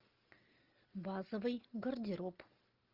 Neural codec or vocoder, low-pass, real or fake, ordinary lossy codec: none; 5.4 kHz; real; Opus, 32 kbps